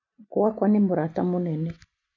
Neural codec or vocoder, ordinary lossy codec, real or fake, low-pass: none; none; real; 7.2 kHz